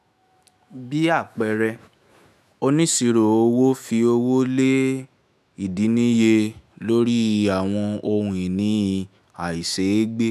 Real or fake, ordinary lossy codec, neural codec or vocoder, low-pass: fake; none; autoencoder, 48 kHz, 128 numbers a frame, DAC-VAE, trained on Japanese speech; 14.4 kHz